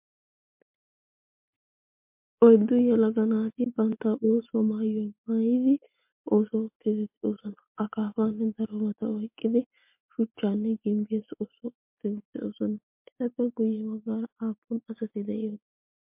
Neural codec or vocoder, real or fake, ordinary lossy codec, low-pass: none; real; MP3, 32 kbps; 3.6 kHz